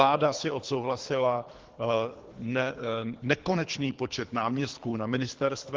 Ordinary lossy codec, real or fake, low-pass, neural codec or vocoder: Opus, 16 kbps; fake; 7.2 kHz; codec, 24 kHz, 3 kbps, HILCodec